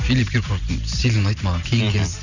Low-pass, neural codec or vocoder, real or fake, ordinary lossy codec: 7.2 kHz; none; real; none